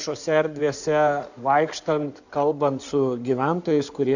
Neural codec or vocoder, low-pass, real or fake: codec, 16 kHz in and 24 kHz out, 2.2 kbps, FireRedTTS-2 codec; 7.2 kHz; fake